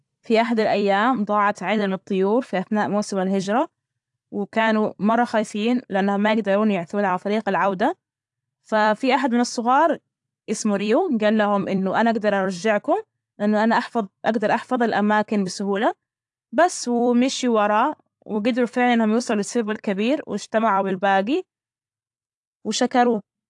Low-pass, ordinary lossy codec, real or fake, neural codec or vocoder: 10.8 kHz; AAC, 64 kbps; fake; vocoder, 44.1 kHz, 128 mel bands every 256 samples, BigVGAN v2